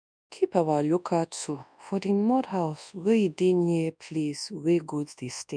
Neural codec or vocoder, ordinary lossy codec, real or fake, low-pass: codec, 24 kHz, 0.9 kbps, WavTokenizer, large speech release; none; fake; 9.9 kHz